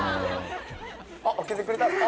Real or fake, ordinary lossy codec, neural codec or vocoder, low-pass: real; none; none; none